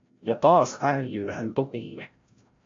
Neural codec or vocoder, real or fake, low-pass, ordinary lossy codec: codec, 16 kHz, 0.5 kbps, FreqCodec, larger model; fake; 7.2 kHz; AAC, 48 kbps